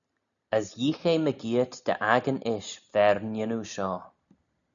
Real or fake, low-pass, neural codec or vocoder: real; 7.2 kHz; none